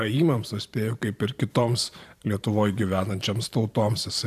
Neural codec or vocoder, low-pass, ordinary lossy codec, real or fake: vocoder, 44.1 kHz, 128 mel bands every 512 samples, BigVGAN v2; 14.4 kHz; AAC, 96 kbps; fake